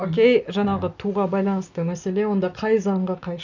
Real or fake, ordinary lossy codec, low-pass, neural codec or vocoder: real; none; 7.2 kHz; none